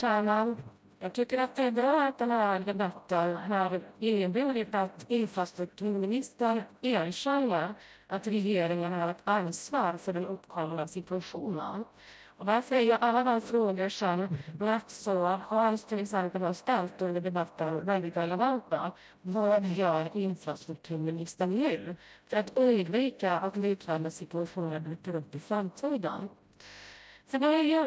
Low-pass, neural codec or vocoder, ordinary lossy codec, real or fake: none; codec, 16 kHz, 0.5 kbps, FreqCodec, smaller model; none; fake